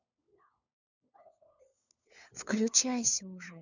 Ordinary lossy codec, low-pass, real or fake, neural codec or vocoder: none; 7.2 kHz; fake; codec, 16 kHz, 4 kbps, FunCodec, trained on LibriTTS, 50 frames a second